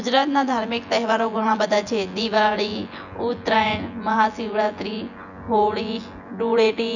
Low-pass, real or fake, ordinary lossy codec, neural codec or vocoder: 7.2 kHz; fake; none; vocoder, 24 kHz, 100 mel bands, Vocos